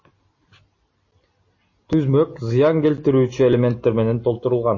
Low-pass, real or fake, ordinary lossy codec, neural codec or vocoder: 7.2 kHz; real; MP3, 32 kbps; none